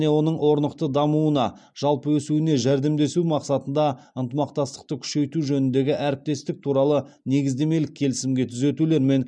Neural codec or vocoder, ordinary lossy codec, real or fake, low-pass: none; none; real; 9.9 kHz